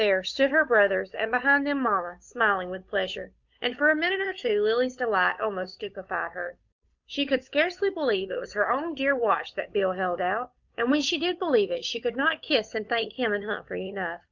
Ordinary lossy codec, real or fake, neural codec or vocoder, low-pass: Opus, 64 kbps; fake; codec, 16 kHz, 8 kbps, FunCodec, trained on Chinese and English, 25 frames a second; 7.2 kHz